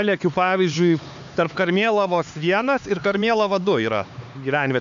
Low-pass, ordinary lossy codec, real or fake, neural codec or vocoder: 7.2 kHz; MP3, 64 kbps; fake; codec, 16 kHz, 4 kbps, X-Codec, HuBERT features, trained on LibriSpeech